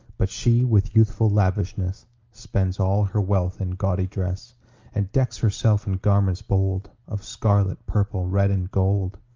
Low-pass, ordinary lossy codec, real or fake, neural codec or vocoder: 7.2 kHz; Opus, 32 kbps; real; none